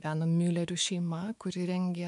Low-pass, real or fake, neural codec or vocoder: 10.8 kHz; fake; autoencoder, 48 kHz, 128 numbers a frame, DAC-VAE, trained on Japanese speech